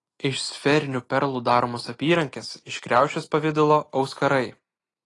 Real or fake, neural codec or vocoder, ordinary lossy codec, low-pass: real; none; AAC, 32 kbps; 10.8 kHz